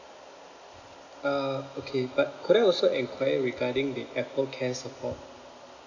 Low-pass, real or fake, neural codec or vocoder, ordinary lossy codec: 7.2 kHz; real; none; none